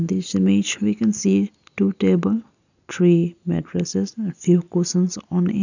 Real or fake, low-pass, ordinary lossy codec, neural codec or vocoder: real; 7.2 kHz; none; none